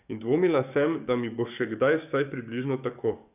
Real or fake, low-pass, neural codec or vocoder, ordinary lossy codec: fake; 3.6 kHz; codec, 44.1 kHz, 7.8 kbps, DAC; none